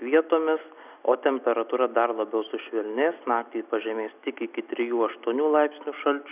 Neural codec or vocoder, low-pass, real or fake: none; 3.6 kHz; real